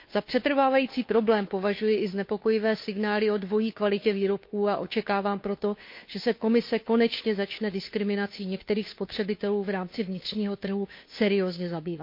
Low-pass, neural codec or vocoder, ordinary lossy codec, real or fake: 5.4 kHz; codec, 16 kHz, 2 kbps, FunCodec, trained on Chinese and English, 25 frames a second; MP3, 32 kbps; fake